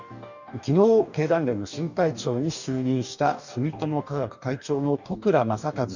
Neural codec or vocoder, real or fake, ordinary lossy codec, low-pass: codec, 44.1 kHz, 2.6 kbps, DAC; fake; none; 7.2 kHz